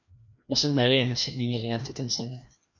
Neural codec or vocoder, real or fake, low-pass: codec, 16 kHz, 1 kbps, FreqCodec, larger model; fake; 7.2 kHz